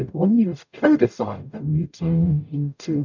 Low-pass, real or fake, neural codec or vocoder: 7.2 kHz; fake; codec, 44.1 kHz, 0.9 kbps, DAC